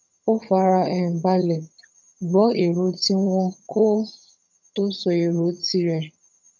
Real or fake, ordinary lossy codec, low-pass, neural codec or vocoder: fake; none; 7.2 kHz; vocoder, 22.05 kHz, 80 mel bands, HiFi-GAN